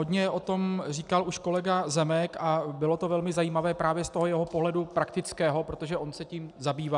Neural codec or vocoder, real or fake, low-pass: none; real; 10.8 kHz